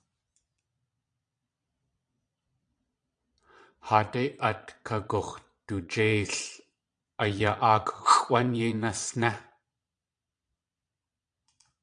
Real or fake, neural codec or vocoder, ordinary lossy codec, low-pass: fake; vocoder, 22.05 kHz, 80 mel bands, Vocos; AAC, 64 kbps; 9.9 kHz